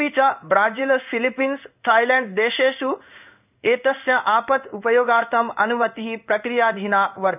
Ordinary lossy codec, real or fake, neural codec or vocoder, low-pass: none; fake; codec, 16 kHz in and 24 kHz out, 1 kbps, XY-Tokenizer; 3.6 kHz